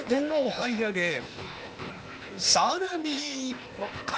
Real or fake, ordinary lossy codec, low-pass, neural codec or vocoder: fake; none; none; codec, 16 kHz, 0.8 kbps, ZipCodec